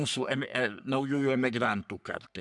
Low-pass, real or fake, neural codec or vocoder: 10.8 kHz; fake; codec, 44.1 kHz, 3.4 kbps, Pupu-Codec